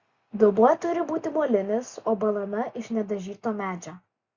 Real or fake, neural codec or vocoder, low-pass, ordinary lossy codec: real; none; 7.2 kHz; Opus, 64 kbps